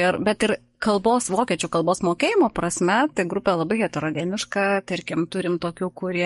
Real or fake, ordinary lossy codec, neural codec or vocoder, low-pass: fake; MP3, 48 kbps; codec, 44.1 kHz, 7.8 kbps, DAC; 19.8 kHz